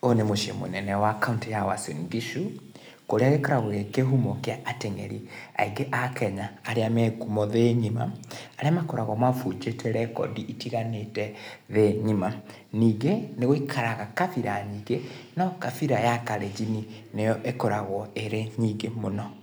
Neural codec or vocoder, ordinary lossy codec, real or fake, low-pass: none; none; real; none